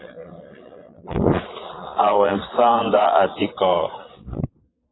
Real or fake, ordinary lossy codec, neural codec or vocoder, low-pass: fake; AAC, 16 kbps; vocoder, 22.05 kHz, 80 mel bands, Vocos; 7.2 kHz